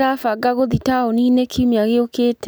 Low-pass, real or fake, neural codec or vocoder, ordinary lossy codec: none; real; none; none